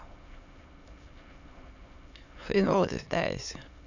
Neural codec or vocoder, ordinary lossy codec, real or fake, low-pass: autoencoder, 22.05 kHz, a latent of 192 numbers a frame, VITS, trained on many speakers; none; fake; 7.2 kHz